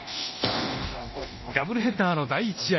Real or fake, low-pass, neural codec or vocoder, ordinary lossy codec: fake; 7.2 kHz; codec, 24 kHz, 0.9 kbps, DualCodec; MP3, 24 kbps